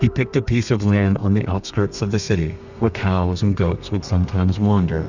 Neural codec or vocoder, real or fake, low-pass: codec, 32 kHz, 1.9 kbps, SNAC; fake; 7.2 kHz